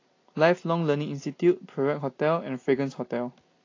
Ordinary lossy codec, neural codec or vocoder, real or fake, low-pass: AAC, 32 kbps; none; real; 7.2 kHz